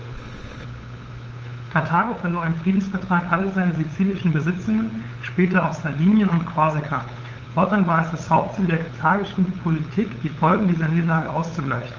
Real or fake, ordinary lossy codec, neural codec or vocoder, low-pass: fake; Opus, 24 kbps; codec, 16 kHz, 8 kbps, FunCodec, trained on LibriTTS, 25 frames a second; 7.2 kHz